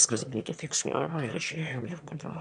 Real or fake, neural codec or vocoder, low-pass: fake; autoencoder, 22.05 kHz, a latent of 192 numbers a frame, VITS, trained on one speaker; 9.9 kHz